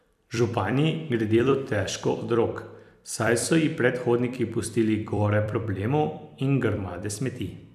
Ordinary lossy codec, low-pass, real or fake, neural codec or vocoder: none; 14.4 kHz; fake; vocoder, 44.1 kHz, 128 mel bands every 256 samples, BigVGAN v2